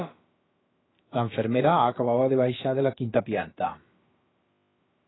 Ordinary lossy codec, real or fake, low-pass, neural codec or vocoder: AAC, 16 kbps; fake; 7.2 kHz; codec, 16 kHz, about 1 kbps, DyCAST, with the encoder's durations